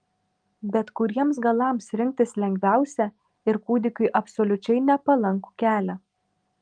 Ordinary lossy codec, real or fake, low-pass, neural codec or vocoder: Opus, 32 kbps; real; 9.9 kHz; none